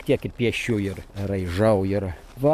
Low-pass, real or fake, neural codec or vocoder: 14.4 kHz; real; none